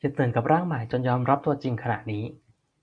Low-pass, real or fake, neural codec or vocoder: 9.9 kHz; real; none